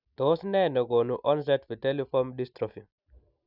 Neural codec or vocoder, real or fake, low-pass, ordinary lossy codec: none; real; 5.4 kHz; none